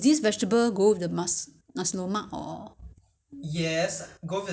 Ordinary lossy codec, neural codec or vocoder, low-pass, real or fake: none; none; none; real